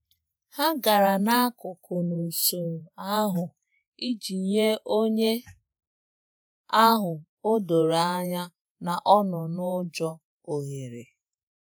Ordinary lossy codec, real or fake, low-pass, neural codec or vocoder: none; fake; none; vocoder, 48 kHz, 128 mel bands, Vocos